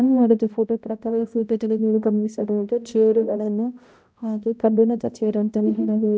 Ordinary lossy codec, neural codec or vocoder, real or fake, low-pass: none; codec, 16 kHz, 0.5 kbps, X-Codec, HuBERT features, trained on balanced general audio; fake; none